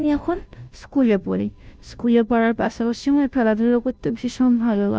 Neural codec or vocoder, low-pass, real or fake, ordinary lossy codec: codec, 16 kHz, 0.5 kbps, FunCodec, trained on Chinese and English, 25 frames a second; none; fake; none